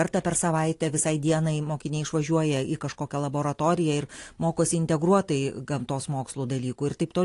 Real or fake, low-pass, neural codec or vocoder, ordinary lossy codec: real; 10.8 kHz; none; AAC, 48 kbps